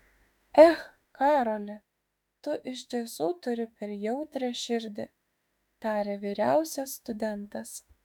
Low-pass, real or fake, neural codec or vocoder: 19.8 kHz; fake; autoencoder, 48 kHz, 32 numbers a frame, DAC-VAE, trained on Japanese speech